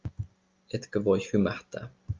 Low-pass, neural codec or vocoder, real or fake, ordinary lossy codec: 7.2 kHz; none; real; Opus, 24 kbps